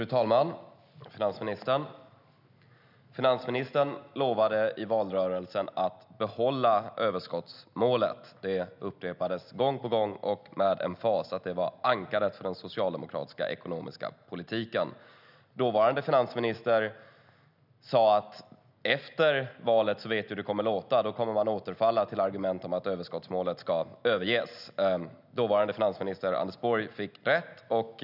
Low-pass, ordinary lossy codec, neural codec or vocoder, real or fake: 5.4 kHz; none; none; real